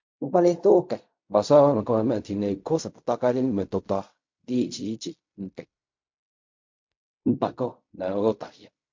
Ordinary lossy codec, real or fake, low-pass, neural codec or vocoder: MP3, 48 kbps; fake; 7.2 kHz; codec, 16 kHz in and 24 kHz out, 0.4 kbps, LongCat-Audio-Codec, fine tuned four codebook decoder